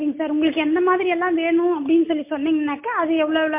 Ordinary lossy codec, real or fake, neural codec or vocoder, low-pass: MP3, 24 kbps; real; none; 3.6 kHz